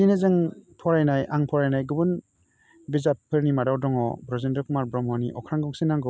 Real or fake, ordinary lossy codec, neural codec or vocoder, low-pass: real; none; none; none